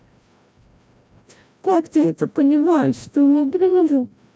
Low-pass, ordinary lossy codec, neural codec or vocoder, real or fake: none; none; codec, 16 kHz, 0.5 kbps, FreqCodec, larger model; fake